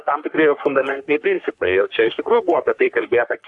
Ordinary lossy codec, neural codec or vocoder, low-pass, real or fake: AAC, 64 kbps; codec, 44.1 kHz, 3.4 kbps, Pupu-Codec; 10.8 kHz; fake